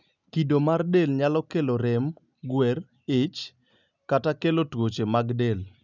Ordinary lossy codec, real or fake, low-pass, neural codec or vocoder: none; real; 7.2 kHz; none